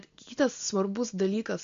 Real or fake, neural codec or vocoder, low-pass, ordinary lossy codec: real; none; 7.2 kHz; MP3, 48 kbps